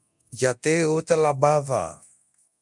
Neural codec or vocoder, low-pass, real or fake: codec, 24 kHz, 0.9 kbps, DualCodec; 10.8 kHz; fake